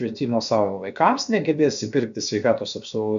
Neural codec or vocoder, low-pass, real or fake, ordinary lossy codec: codec, 16 kHz, about 1 kbps, DyCAST, with the encoder's durations; 7.2 kHz; fake; MP3, 64 kbps